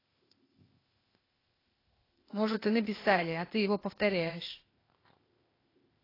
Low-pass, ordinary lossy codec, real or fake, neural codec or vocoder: 5.4 kHz; AAC, 24 kbps; fake; codec, 16 kHz, 0.8 kbps, ZipCodec